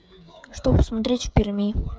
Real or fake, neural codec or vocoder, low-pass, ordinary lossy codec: fake; codec, 16 kHz, 8 kbps, FreqCodec, smaller model; none; none